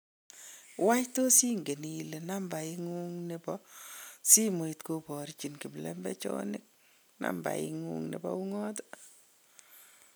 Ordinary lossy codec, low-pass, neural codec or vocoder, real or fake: none; none; none; real